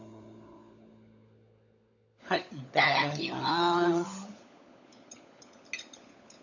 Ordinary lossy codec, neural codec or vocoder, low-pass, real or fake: none; codec, 16 kHz, 16 kbps, FunCodec, trained on LibriTTS, 50 frames a second; 7.2 kHz; fake